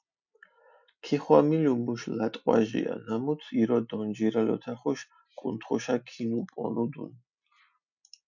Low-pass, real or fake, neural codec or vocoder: 7.2 kHz; real; none